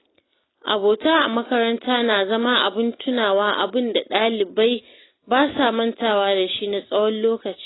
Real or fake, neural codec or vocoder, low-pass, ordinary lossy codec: real; none; 7.2 kHz; AAC, 16 kbps